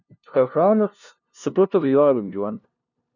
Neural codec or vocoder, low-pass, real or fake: codec, 16 kHz, 0.5 kbps, FunCodec, trained on LibriTTS, 25 frames a second; 7.2 kHz; fake